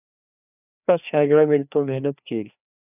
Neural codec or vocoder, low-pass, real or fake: codec, 16 kHz, 2 kbps, FreqCodec, larger model; 3.6 kHz; fake